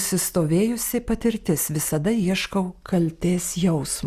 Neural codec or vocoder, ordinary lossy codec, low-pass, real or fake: none; Opus, 64 kbps; 14.4 kHz; real